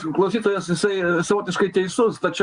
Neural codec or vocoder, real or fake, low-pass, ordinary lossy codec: none; real; 9.9 kHz; Opus, 64 kbps